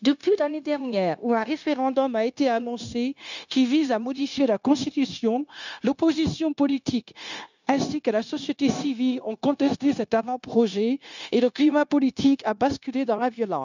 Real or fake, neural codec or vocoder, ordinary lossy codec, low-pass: fake; codec, 16 kHz, 0.9 kbps, LongCat-Audio-Codec; none; 7.2 kHz